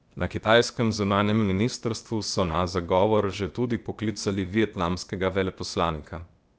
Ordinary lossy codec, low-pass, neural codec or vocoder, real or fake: none; none; codec, 16 kHz, 0.8 kbps, ZipCodec; fake